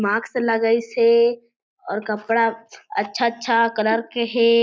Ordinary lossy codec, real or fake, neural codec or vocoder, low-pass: none; real; none; none